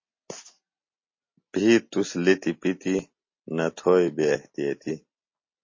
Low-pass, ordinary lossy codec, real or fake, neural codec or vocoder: 7.2 kHz; MP3, 32 kbps; real; none